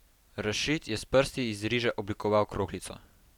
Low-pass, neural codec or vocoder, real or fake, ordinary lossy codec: 19.8 kHz; vocoder, 44.1 kHz, 128 mel bands every 256 samples, BigVGAN v2; fake; none